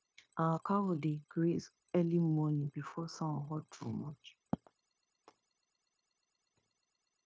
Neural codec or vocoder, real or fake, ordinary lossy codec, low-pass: codec, 16 kHz, 0.9 kbps, LongCat-Audio-Codec; fake; none; none